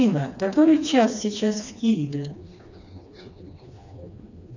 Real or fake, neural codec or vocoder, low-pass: fake; codec, 16 kHz, 2 kbps, FreqCodec, smaller model; 7.2 kHz